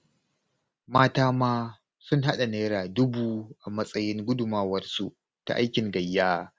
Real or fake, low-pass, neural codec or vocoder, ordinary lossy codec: real; none; none; none